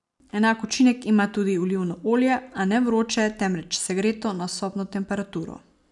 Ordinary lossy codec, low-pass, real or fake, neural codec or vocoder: none; 10.8 kHz; real; none